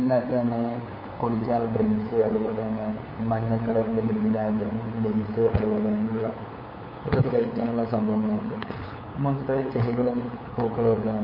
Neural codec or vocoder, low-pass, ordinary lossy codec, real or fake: codec, 16 kHz, 8 kbps, FunCodec, trained on LibriTTS, 25 frames a second; 5.4 kHz; MP3, 24 kbps; fake